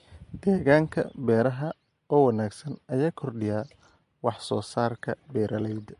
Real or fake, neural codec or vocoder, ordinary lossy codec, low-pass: real; none; MP3, 48 kbps; 14.4 kHz